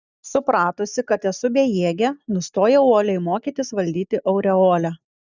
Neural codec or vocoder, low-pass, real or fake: none; 7.2 kHz; real